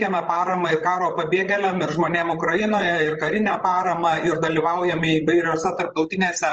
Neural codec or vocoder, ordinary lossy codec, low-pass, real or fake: codec, 16 kHz, 16 kbps, FreqCodec, larger model; Opus, 24 kbps; 7.2 kHz; fake